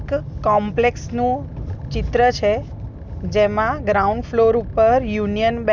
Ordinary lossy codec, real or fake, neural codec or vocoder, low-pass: Opus, 64 kbps; real; none; 7.2 kHz